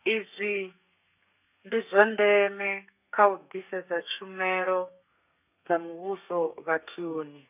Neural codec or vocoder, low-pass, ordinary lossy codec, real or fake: codec, 32 kHz, 1.9 kbps, SNAC; 3.6 kHz; none; fake